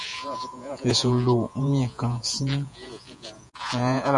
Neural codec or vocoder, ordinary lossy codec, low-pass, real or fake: vocoder, 48 kHz, 128 mel bands, Vocos; MP3, 64 kbps; 10.8 kHz; fake